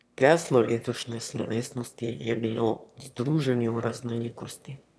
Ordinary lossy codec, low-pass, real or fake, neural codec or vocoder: none; none; fake; autoencoder, 22.05 kHz, a latent of 192 numbers a frame, VITS, trained on one speaker